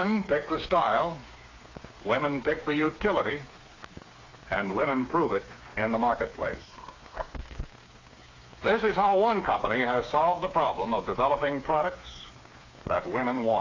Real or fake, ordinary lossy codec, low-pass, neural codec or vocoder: fake; AAC, 32 kbps; 7.2 kHz; codec, 16 kHz, 4 kbps, FreqCodec, smaller model